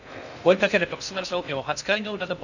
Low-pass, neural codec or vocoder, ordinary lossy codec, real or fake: 7.2 kHz; codec, 16 kHz in and 24 kHz out, 0.6 kbps, FocalCodec, streaming, 2048 codes; none; fake